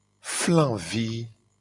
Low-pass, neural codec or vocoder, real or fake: 10.8 kHz; none; real